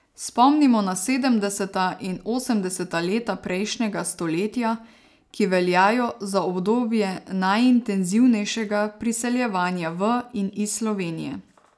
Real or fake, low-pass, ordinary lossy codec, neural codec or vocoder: real; none; none; none